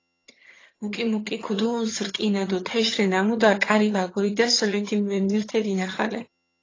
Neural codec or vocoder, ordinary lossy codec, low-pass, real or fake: vocoder, 22.05 kHz, 80 mel bands, HiFi-GAN; AAC, 32 kbps; 7.2 kHz; fake